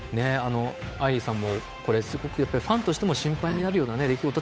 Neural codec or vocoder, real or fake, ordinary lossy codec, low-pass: codec, 16 kHz, 8 kbps, FunCodec, trained on Chinese and English, 25 frames a second; fake; none; none